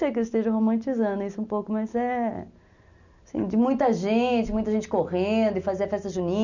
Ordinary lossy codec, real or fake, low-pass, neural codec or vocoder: none; real; 7.2 kHz; none